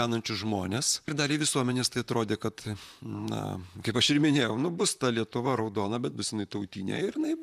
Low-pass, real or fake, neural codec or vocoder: 14.4 kHz; fake; vocoder, 44.1 kHz, 128 mel bands, Pupu-Vocoder